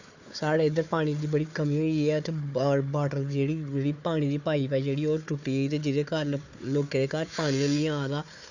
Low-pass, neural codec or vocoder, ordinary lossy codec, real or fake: 7.2 kHz; codec, 16 kHz, 4 kbps, FunCodec, trained on Chinese and English, 50 frames a second; none; fake